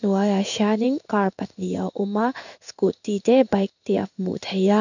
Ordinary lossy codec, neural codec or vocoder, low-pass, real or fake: none; codec, 16 kHz in and 24 kHz out, 1 kbps, XY-Tokenizer; 7.2 kHz; fake